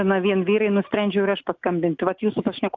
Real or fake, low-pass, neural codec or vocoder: real; 7.2 kHz; none